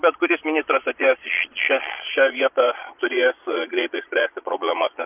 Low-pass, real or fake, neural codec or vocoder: 3.6 kHz; fake; vocoder, 22.05 kHz, 80 mel bands, Vocos